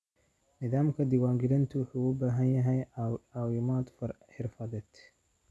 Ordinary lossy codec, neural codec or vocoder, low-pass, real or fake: none; none; none; real